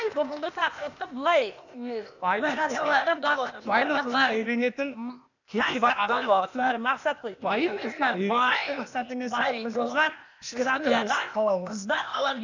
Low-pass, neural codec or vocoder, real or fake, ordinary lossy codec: 7.2 kHz; codec, 16 kHz, 0.8 kbps, ZipCodec; fake; none